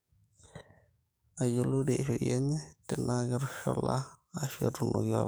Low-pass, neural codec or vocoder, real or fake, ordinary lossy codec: none; codec, 44.1 kHz, 7.8 kbps, DAC; fake; none